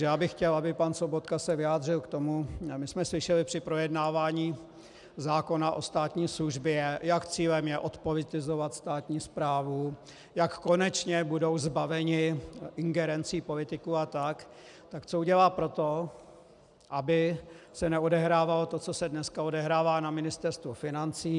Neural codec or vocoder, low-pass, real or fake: none; 10.8 kHz; real